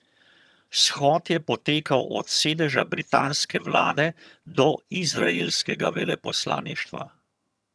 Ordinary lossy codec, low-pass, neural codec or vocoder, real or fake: none; none; vocoder, 22.05 kHz, 80 mel bands, HiFi-GAN; fake